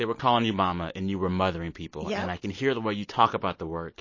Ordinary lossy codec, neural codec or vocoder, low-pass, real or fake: MP3, 32 kbps; none; 7.2 kHz; real